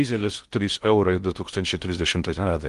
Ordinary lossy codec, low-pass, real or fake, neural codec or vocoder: Opus, 24 kbps; 10.8 kHz; fake; codec, 16 kHz in and 24 kHz out, 0.6 kbps, FocalCodec, streaming, 2048 codes